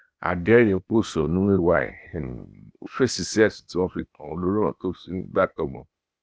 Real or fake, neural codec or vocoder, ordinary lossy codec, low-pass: fake; codec, 16 kHz, 0.8 kbps, ZipCodec; none; none